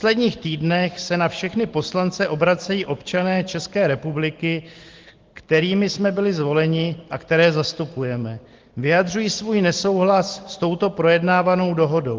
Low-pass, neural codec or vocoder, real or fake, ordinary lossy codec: 7.2 kHz; none; real; Opus, 16 kbps